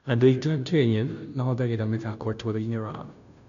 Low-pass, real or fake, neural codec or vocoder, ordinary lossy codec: 7.2 kHz; fake; codec, 16 kHz, 0.5 kbps, FunCodec, trained on Chinese and English, 25 frames a second; none